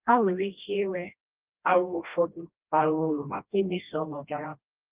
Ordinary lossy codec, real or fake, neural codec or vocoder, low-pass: Opus, 32 kbps; fake; codec, 16 kHz, 1 kbps, FreqCodec, smaller model; 3.6 kHz